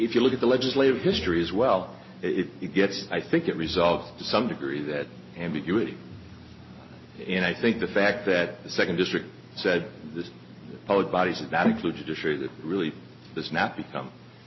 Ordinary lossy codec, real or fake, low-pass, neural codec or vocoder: MP3, 24 kbps; real; 7.2 kHz; none